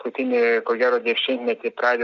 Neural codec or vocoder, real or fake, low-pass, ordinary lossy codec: none; real; 7.2 kHz; Opus, 64 kbps